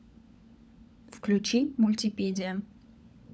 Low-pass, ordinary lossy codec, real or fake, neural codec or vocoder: none; none; fake; codec, 16 kHz, 16 kbps, FunCodec, trained on LibriTTS, 50 frames a second